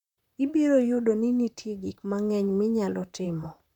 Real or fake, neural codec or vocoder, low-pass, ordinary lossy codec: fake; vocoder, 44.1 kHz, 128 mel bands, Pupu-Vocoder; 19.8 kHz; none